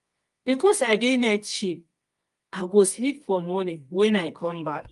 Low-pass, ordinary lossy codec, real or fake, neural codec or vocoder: 10.8 kHz; Opus, 32 kbps; fake; codec, 24 kHz, 0.9 kbps, WavTokenizer, medium music audio release